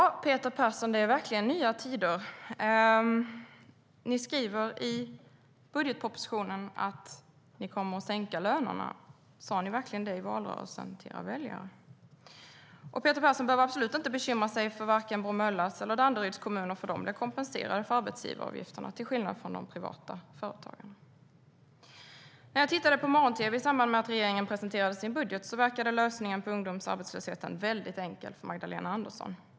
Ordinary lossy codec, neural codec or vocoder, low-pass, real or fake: none; none; none; real